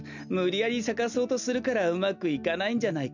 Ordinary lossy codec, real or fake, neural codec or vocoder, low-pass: none; real; none; 7.2 kHz